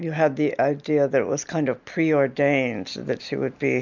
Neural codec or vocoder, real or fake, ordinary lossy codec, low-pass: none; real; MP3, 64 kbps; 7.2 kHz